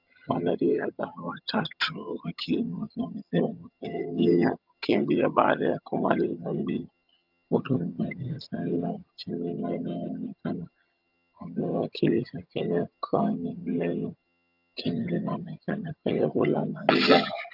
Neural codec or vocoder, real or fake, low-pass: vocoder, 22.05 kHz, 80 mel bands, HiFi-GAN; fake; 5.4 kHz